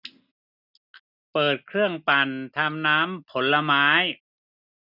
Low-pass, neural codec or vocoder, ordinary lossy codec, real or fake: 5.4 kHz; none; none; real